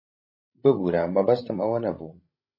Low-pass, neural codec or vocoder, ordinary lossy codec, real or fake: 5.4 kHz; codec, 16 kHz, 16 kbps, FreqCodec, larger model; MP3, 24 kbps; fake